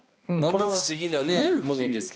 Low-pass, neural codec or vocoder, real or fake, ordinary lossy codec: none; codec, 16 kHz, 1 kbps, X-Codec, HuBERT features, trained on balanced general audio; fake; none